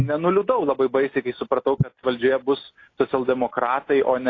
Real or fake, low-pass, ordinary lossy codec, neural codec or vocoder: real; 7.2 kHz; AAC, 32 kbps; none